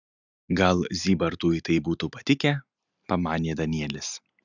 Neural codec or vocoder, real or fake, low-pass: none; real; 7.2 kHz